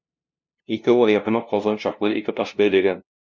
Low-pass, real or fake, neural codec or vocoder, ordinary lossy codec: 7.2 kHz; fake; codec, 16 kHz, 0.5 kbps, FunCodec, trained on LibriTTS, 25 frames a second; MP3, 64 kbps